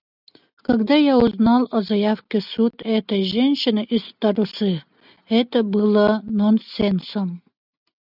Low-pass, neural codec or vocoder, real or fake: 5.4 kHz; none; real